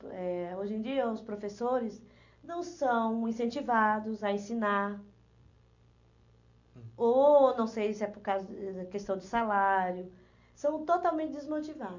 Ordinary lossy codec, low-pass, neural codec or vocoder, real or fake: none; 7.2 kHz; none; real